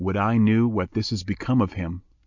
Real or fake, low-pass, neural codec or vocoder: real; 7.2 kHz; none